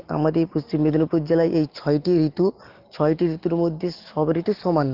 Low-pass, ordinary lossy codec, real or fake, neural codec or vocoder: 5.4 kHz; Opus, 16 kbps; real; none